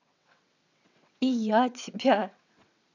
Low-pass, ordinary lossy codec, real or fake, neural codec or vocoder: 7.2 kHz; none; fake; vocoder, 22.05 kHz, 80 mel bands, WaveNeXt